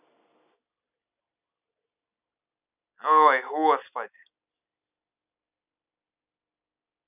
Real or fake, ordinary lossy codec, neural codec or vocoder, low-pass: real; none; none; 3.6 kHz